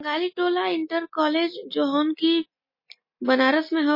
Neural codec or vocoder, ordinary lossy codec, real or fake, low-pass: none; MP3, 24 kbps; real; 5.4 kHz